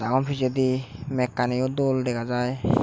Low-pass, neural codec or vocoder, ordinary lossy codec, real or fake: none; none; none; real